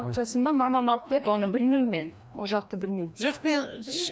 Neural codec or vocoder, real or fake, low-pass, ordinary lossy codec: codec, 16 kHz, 1 kbps, FreqCodec, larger model; fake; none; none